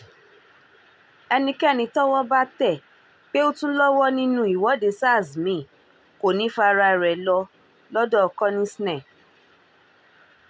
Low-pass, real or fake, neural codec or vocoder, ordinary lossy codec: none; real; none; none